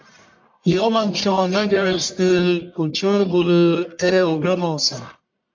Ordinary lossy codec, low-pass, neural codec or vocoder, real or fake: MP3, 48 kbps; 7.2 kHz; codec, 44.1 kHz, 1.7 kbps, Pupu-Codec; fake